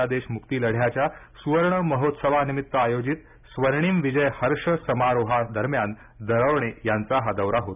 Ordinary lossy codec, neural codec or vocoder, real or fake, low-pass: none; none; real; 3.6 kHz